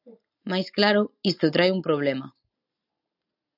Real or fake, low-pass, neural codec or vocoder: real; 5.4 kHz; none